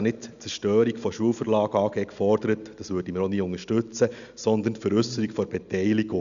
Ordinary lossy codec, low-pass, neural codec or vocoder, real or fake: none; 7.2 kHz; none; real